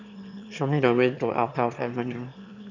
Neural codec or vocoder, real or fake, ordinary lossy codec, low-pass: autoencoder, 22.05 kHz, a latent of 192 numbers a frame, VITS, trained on one speaker; fake; none; 7.2 kHz